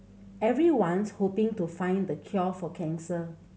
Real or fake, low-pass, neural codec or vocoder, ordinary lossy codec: real; none; none; none